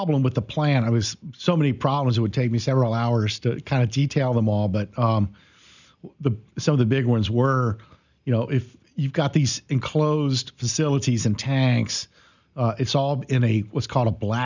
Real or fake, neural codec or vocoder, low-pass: real; none; 7.2 kHz